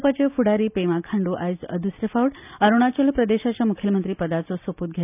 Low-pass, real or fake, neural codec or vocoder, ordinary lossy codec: 3.6 kHz; real; none; none